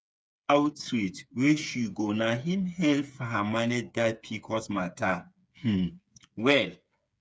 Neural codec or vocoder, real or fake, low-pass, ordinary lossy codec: codec, 16 kHz, 8 kbps, FreqCodec, smaller model; fake; none; none